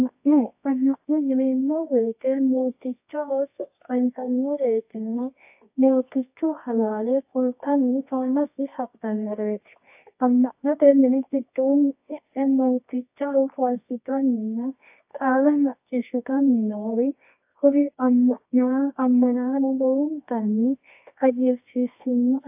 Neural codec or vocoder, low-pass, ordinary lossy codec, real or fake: codec, 24 kHz, 0.9 kbps, WavTokenizer, medium music audio release; 3.6 kHz; AAC, 32 kbps; fake